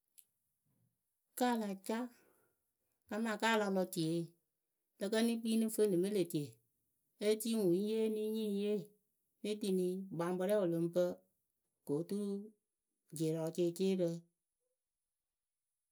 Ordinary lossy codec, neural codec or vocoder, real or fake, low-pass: none; none; real; none